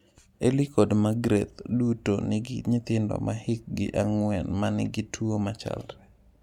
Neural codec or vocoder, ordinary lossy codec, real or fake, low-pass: none; MP3, 96 kbps; real; 19.8 kHz